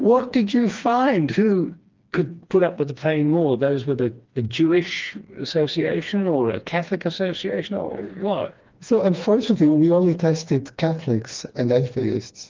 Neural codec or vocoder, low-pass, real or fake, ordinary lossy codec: codec, 16 kHz, 2 kbps, FreqCodec, smaller model; 7.2 kHz; fake; Opus, 24 kbps